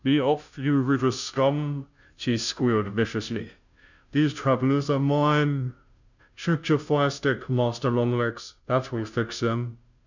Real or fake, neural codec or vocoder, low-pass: fake; codec, 16 kHz, 0.5 kbps, FunCodec, trained on Chinese and English, 25 frames a second; 7.2 kHz